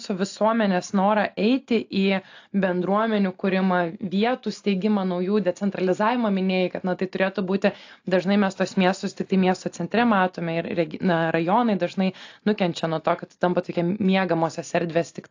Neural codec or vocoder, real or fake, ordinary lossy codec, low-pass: none; real; AAC, 48 kbps; 7.2 kHz